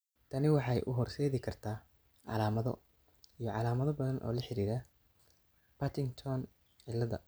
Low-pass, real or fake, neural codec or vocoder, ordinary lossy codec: none; real; none; none